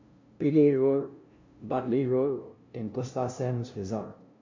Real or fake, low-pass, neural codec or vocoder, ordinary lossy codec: fake; 7.2 kHz; codec, 16 kHz, 0.5 kbps, FunCodec, trained on LibriTTS, 25 frames a second; MP3, 64 kbps